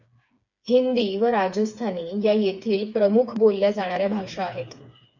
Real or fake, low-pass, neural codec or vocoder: fake; 7.2 kHz; codec, 16 kHz, 4 kbps, FreqCodec, smaller model